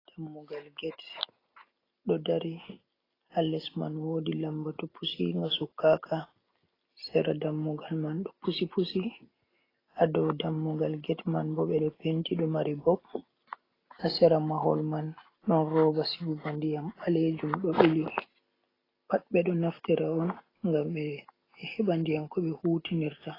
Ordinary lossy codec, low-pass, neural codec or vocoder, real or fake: AAC, 24 kbps; 5.4 kHz; none; real